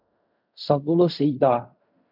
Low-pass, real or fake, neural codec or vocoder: 5.4 kHz; fake; codec, 16 kHz in and 24 kHz out, 0.4 kbps, LongCat-Audio-Codec, fine tuned four codebook decoder